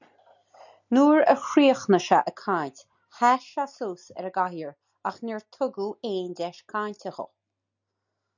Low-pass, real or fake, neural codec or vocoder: 7.2 kHz; real; none